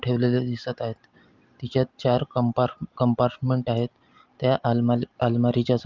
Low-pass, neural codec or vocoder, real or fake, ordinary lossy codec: 7.2 kHz; none; real; Opus, 32 kbps